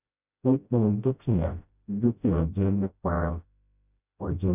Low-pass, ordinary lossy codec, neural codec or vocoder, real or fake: 3.6 kHz; none; codec, 16 kHz, 0.5 kbps, FreqCodec, smaller model; fake